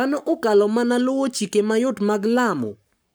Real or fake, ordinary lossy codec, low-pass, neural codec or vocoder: fake; none; none; codec, 44.1 kHz, 7.8 kbps, Pupu-Codec